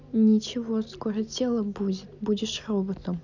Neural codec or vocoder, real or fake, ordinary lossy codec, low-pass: none; real; none; 7.2 kHz